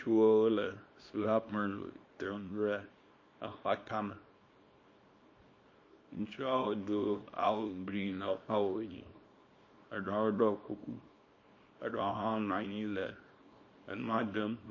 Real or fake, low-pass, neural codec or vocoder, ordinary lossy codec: fake; 7.2 kHz; codec, 24 kHz, 0.9 kbps, WavTokenizer, small release; MP3, 32 kbps